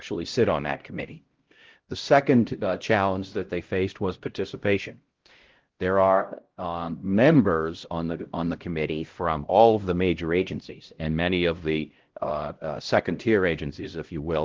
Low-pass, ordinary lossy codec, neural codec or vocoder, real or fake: 7.2 kHz; Opus, 16 kbps; codec, 16 kHz, 0.5 kbps, X-Codec, HuBERT features, trained on LibriSpeech; fake